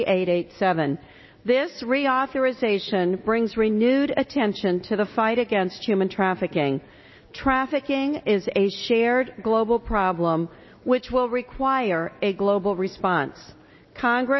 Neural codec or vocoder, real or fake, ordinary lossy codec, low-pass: none; real; MP3, 24 kbps; 7.2 kHz